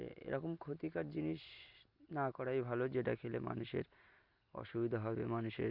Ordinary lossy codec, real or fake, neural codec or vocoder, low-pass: Opus, 64 kbps; real; none; 5.4 kHz